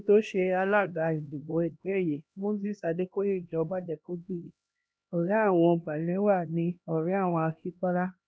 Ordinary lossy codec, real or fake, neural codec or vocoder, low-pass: none; fake; codec, 16 kHz, 1 kbps, X-Codec, HuBERT features, trained on LibriSpeech; none